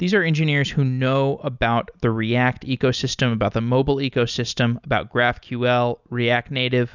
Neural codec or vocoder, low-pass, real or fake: none; 7.2 kHz; real